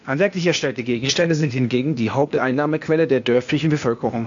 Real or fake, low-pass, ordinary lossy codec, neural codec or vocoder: fake; 7.2 kHz; AAC, 64 kbps; codec, 16 kHz, 0.8 kbps, ZipCodec